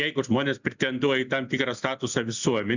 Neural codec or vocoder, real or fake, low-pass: none; real; 7.2 kHz